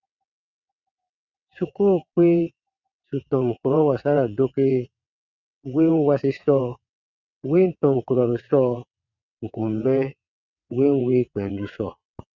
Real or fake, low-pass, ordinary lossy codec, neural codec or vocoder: fake; 7.2 kHz; Opus, 64 kbps; vocoder, 22.05 kHz, 80 mel bands, WaveNeXt